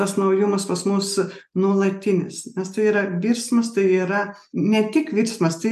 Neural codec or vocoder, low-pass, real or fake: none; 14.4 kHz; real